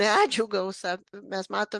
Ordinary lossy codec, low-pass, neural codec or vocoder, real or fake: Opus, 32 kbps; 10.8 kHz; none; real